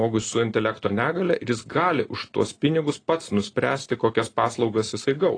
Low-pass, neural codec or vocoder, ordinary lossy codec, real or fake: 9.9 kHz; none; AAC, 32 kbps; real